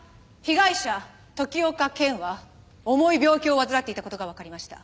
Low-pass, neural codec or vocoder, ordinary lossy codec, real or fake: none; none; none; real